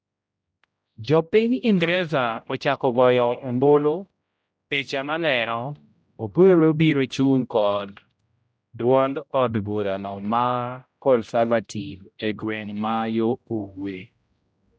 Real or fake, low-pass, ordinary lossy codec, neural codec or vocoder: fake; none; none; codec, 16 kHz, 0.5 kbps, X-Codec, HuBERT features, trained on general audio